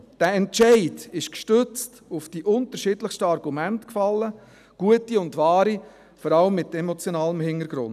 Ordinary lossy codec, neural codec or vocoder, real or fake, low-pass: none; none; real; 14.4 kHz